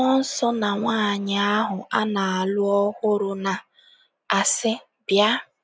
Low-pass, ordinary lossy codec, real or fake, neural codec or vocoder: none; none; real; none